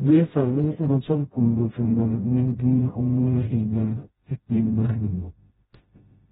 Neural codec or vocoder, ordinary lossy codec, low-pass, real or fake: codec, 16 kHz, 0.5 kbps, FreqCodec, smaller model; AAC, 16 kbps; 7.2 kHz; fake